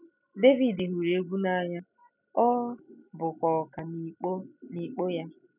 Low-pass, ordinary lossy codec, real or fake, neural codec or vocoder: 3.6 kHz; none; real; none